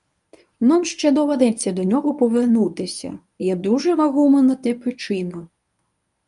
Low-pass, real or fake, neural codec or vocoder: 10.8 kHz; fake; codec, 24 kHz, 0.9 kbps, WavTokenizer, medium speech release version 1